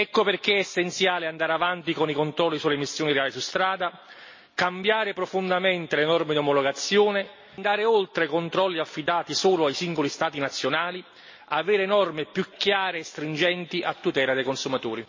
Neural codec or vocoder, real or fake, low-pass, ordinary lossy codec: none; real; 7.2 kHz; MP3, 32 kbps